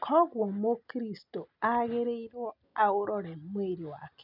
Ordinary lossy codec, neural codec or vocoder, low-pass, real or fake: none; none; 5.4 kHz; real